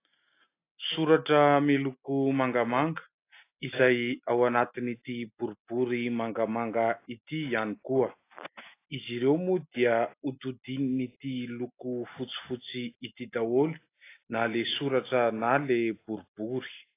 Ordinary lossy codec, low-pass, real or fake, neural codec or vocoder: AAC, 24 kbps; 3.6 kHz; real; none